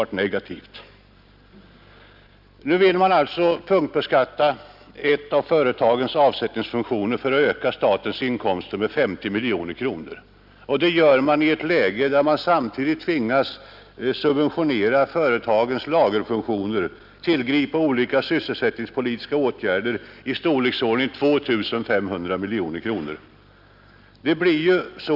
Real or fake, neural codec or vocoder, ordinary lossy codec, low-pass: real; none; none; 5.4 kHz